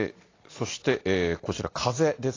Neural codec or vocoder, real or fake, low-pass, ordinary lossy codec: none; real; 7.2 kHz; AAC, 32 kbps